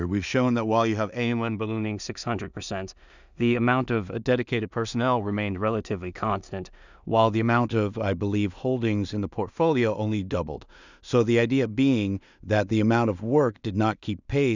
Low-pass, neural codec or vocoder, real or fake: 7.2 kHz; codec, 16 kHz in and 24 kHz out, 0.4 kbps, LongCat-Audio-Codec, two codebook decoder; fake